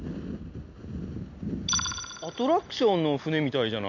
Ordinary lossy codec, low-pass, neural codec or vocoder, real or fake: none; 7.2 kHz; none; real